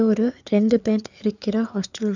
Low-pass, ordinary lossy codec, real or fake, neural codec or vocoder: 7.2 kHz; none; fake; codec, 16 kHz, 4 kbps, FreqCodec, larger model